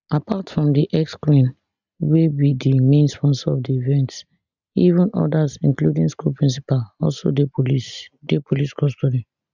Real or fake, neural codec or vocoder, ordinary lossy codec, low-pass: real; none; none; 7.2 kHz